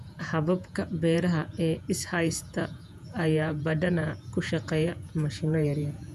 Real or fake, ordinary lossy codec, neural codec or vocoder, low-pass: fake; none; vocoder, 48 kHz, 128 mel bands, Vocos; 14.4 kHz